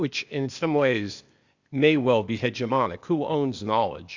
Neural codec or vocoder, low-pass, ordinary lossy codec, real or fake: codec, 16 kHz, 0.8 kbps, ZipCodec; 7.2 kHz; Opus, 64 kbps; fake